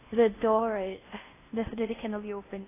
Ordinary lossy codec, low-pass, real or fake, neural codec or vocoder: AAC, 16 kbps; 3.6 kHz; fake; codec, 16 kHz in and 24 kHz out, 0.8 kbps, FocalCodec, streaming, 65536 codes